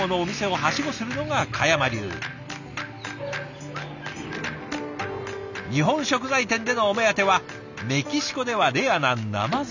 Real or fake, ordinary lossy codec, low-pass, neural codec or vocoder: real; none; 7.2 kHz; none